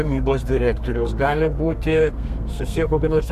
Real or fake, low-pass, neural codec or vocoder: fake; 14.4 kHz; codec, 32 kHz, 1.9 kbps, SNAC